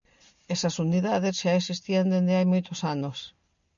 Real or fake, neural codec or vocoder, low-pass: real; none; 7.2 kHz